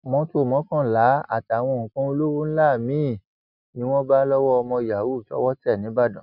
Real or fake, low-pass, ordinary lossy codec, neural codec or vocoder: real; 5.4 kHz; none; none